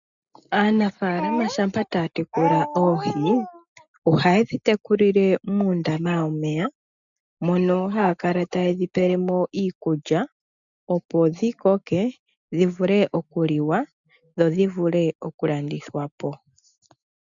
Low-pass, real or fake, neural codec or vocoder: 7.2 kHz; real; none